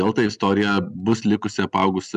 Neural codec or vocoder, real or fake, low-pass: none; real; 10.8 kHz